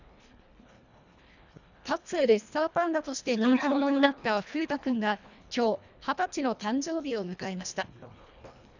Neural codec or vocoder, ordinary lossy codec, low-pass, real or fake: codec, 24 kHz, 1.5 kbps, HILCodec; none; 7.2 kHz; fake